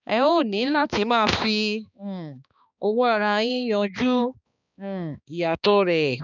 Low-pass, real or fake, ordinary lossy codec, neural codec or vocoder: 7.2 kHz; fake; none; codec, 16 kHz, 2 kbps, X-Codec, HuBERT features, trained on balanced general audio